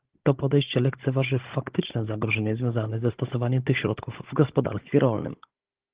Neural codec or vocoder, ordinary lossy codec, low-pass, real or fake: codec, 16 kHz, 16 kbps, FunCodec, trained on Chinese and English, 50 frames a second; Opus, 16 kbps; 3.6 kHz; fake